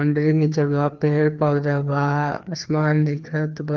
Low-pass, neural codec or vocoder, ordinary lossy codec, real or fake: 7.2 kHz; codec, 16 kHz, 2 kbps, FreqCodec, larger model; Opus, 32 kbps; fake